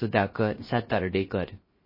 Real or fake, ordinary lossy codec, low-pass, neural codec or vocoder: fake; MP3, 24 kbps; 5.4 kHz; codec, 16 kHz, 0.3 kbps, FocalCodec